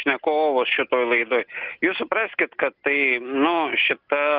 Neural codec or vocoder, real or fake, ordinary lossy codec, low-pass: none; real; Opus, 24 kbps; 5.4 kHz